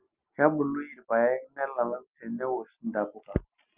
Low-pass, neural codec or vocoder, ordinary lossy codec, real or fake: 3.6 kHz; none; Opus, 24 kbps; real